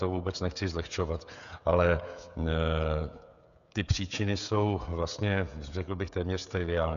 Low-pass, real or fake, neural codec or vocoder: 7.2 kHz; fake; codec, 16 kHz, 16 kbps, FreqCodec, smaller model